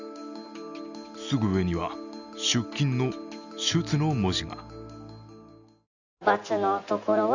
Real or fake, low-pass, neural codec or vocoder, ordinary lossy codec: real; 7.2 kHz; none; none